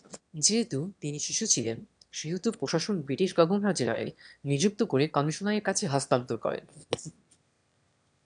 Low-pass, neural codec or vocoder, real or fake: 9.9 kHz; autoencoder, 22.05 kHz, a latent of 192 numbers a frame, VITS, trained on one speaker; fake